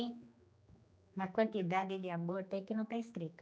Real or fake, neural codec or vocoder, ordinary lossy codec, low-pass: fake; codec, 16 kHz, 2 kbps, X-Codec, HuBERT features, trained on general audio; none; none